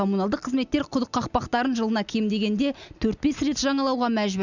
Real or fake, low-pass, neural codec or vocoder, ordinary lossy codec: real; 7.2 kHz; none; none